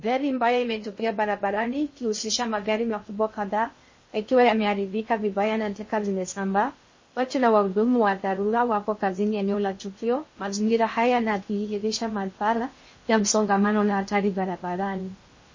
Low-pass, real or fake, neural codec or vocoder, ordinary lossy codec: 7.2 kHz; fake; codec, 16 kHz in and 24 kHz out, 0.6 kbps, FocalCodec, streaming, 2048 codes; MP3, 32 kbps